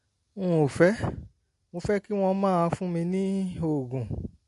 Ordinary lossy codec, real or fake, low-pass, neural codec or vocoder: MP3, 48 kbps; real; 14.4 kHz; none